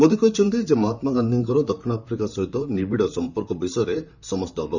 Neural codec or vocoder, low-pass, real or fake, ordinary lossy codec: vocoder, 44.1 kHz, 128 mel bands, Pupu-Vocoder; 7.2 kHz; fake; none